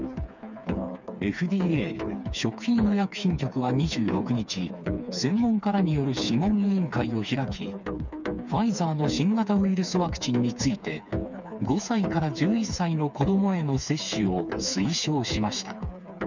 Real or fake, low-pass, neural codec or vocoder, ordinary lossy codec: fake; 7.2 kHz; codec, 16 kHz, 4 kbps, FreqCodec, smaller model; none